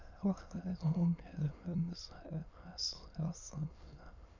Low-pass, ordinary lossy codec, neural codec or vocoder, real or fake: 7.2 kHz; none; autoencoder, 22.05 kHz, a latent of 192 numbers a frame, VITS, trained on many speakers; fake